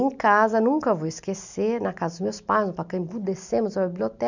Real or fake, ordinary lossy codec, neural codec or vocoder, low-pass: real; none; none; 7.2 kHz